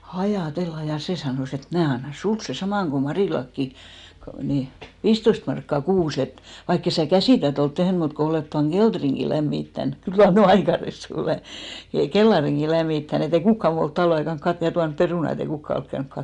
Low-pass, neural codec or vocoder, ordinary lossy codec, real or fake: 10.8 kHz; none; none; real